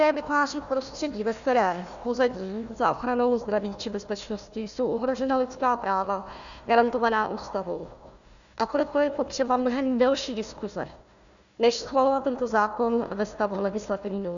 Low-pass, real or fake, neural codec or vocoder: 7.2 kHz; fake; codec, 16 kHz, 1 kbps, FunCodec, trained on Chinese and English, 50 frames a second